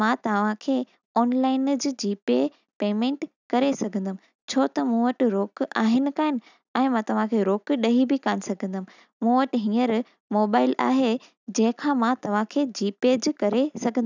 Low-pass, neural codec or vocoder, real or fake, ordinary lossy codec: 7.2 kHz; none; real; none